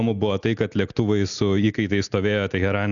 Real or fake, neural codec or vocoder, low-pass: real; none; 7.2 kHz